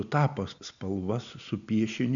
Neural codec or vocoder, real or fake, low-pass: none; real; 7.2 kHz